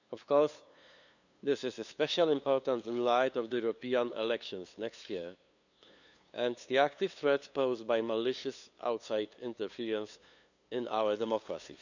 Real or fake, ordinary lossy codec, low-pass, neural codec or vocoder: fake; none; 7.2 kHz; codec, 16 kHz, 2 kbps, FunCodec, trained on LibriTTS, 25 frames a second